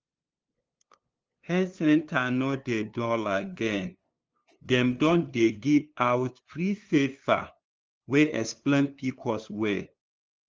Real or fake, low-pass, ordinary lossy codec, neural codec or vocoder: fake; 7.2 kHz; Opus, 24 kbps; codec, 16 kHz, 2 kbps, FunCodec, trained on LibriTTS, 25 frames a second